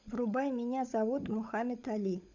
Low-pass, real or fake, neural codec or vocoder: 7.2 kHz; fake; codec, 16 kHz, 16 kbps, FunCodec, trained on LibriTTS, 50 frames a second